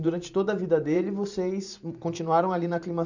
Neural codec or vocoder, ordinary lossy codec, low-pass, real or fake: vocoder, 44.1 kHz, 128 mel bands every 512 samples, BigVGAN v2; none; 7.2 kHz; fake